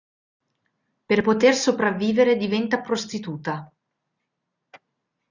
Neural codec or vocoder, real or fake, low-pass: none; real; 7.2 kHz